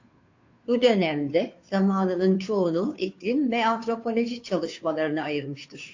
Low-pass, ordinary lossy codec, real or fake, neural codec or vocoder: 7.2 kHz; AAC, 48 kbps; fake; codec, 16 kHz, 2 kbps, FunCodec, trained on Chinese and English, 25 frames a second